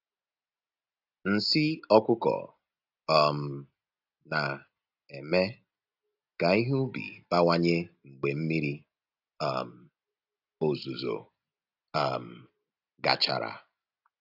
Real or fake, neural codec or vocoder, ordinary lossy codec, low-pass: real; none; AAC, 48 kbps; 5.4 kHz